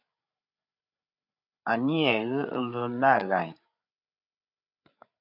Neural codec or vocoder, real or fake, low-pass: codec, 16 kHz, 8 kbps, FreqCodec, larger model; fake; 5.4 kHz